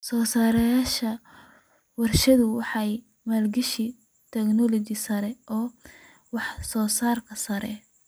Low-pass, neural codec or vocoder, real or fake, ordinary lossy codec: none; none; real; none